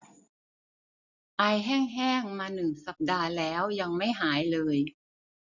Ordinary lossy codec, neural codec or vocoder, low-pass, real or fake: none; none; 7.2 kHz; real